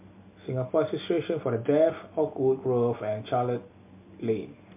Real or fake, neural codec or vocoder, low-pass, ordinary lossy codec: real; none; 3.6 kHz; MP3, 32 kbps